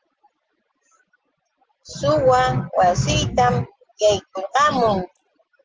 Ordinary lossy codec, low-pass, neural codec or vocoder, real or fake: Opus, 16 kbps; 7.2 kHz; none; real